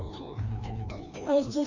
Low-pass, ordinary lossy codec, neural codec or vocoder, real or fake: 7.2 kHz; none; codec, 16 kHz, 1 kbps, FreqCodec, larger model; fake